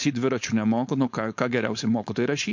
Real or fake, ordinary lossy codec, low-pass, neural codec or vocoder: fake; MP3, 48 kbps; 7.2 kHz; codec, 16 kHz, 4.8 kbps, FACodec